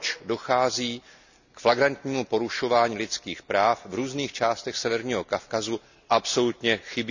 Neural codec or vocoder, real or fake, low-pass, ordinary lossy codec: none; real; 7.2 kHz; none